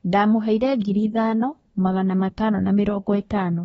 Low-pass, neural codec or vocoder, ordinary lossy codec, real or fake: 10.8 kHz; codec, 24 kHz, 1 kbps, SNAC; AAC, 24 kbps; fake